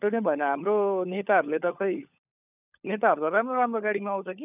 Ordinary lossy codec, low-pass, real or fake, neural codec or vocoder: none; 3.6 kHz; fake; codec, 16 kHz, 16 kbps, FunCodec, trained on LibriTTS, 50 frames a second